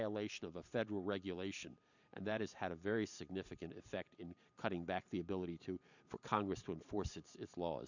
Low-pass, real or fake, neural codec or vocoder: 7.2 kHz; fake; vocoder, 44.1 kHz, 128 mel bands every 512 samples, BigVGAN v2